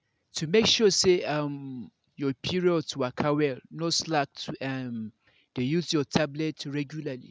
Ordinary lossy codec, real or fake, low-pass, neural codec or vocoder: none; real; none; none